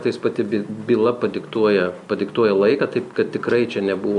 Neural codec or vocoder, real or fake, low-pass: none; real; 10.8 kHz